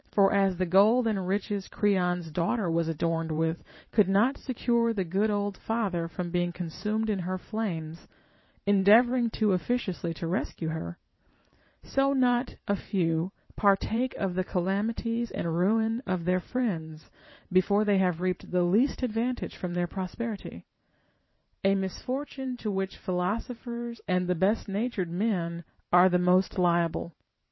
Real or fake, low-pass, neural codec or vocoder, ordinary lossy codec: fake; 7.2 kHz; vocoder, 44.1 kHz, 128 mel bands every 512 samples, BigVGAN v2; MP3, 24 kbps